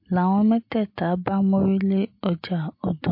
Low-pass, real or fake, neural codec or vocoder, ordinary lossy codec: 5.4 kHz; real; none; MP3, 32 kbps